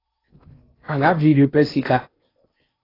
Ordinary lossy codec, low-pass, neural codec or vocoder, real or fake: AAC, 24 kbps; 5.4 kHz; codec, 16 kHz in and 24 kHz out, 0.8 kbps, FocalCodec, streaming, 65536 codes; fake